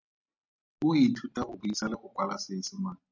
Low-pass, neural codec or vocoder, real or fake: 7.2 kHz; none; real